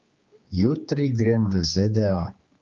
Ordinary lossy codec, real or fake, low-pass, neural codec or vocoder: Opus, 24 kbps; fake; 7.2 kHz; codec, 16 kHz, 2 kbps, X-Codec, HuBERT features, trained on general audio